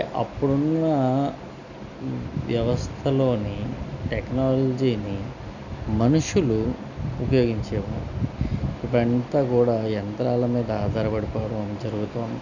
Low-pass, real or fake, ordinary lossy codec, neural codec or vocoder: 7.2 kHz; real; none; none